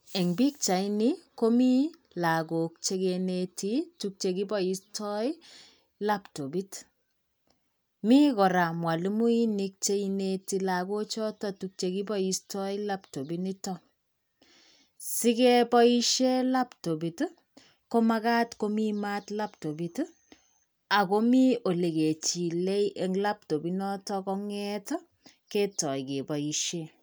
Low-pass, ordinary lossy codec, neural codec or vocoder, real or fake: none; none; none; real